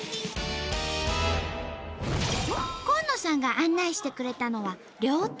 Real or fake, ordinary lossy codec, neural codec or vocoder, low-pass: real; none; none; none